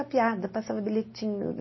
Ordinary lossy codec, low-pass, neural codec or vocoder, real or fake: MP3, 24 kbps; 7.2 kHz; none; real